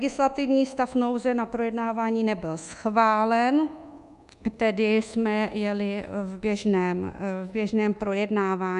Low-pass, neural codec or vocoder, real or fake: 10.8 kHz; codec, 24 kHz, 1.2 kbps, DualCodec; fake